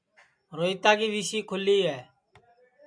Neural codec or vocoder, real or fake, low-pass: none; real; 9.9 kHz